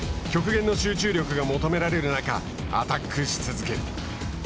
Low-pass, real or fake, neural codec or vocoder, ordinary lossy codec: none; real; none; none